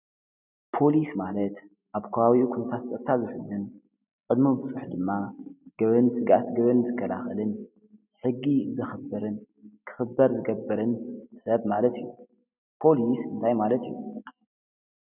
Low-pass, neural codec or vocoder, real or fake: 3.6 kHz; none; real